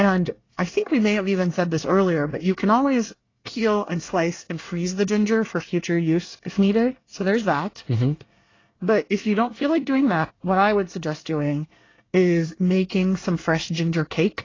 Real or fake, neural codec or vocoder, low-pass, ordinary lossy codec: fake; codec, 24 kHz, 1 kbps, SNAC; 7.2 kHz; AAC, 32 kbps